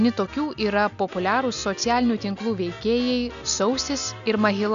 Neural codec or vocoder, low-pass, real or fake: none; 7.2 kHz; real